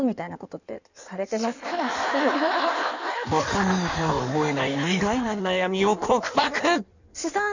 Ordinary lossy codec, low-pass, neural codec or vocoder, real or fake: none; 7.2 kHz; codec, 16 kHz in and 24 kHz out, 1.1 kbps, FireRedTTS-2 codec; fake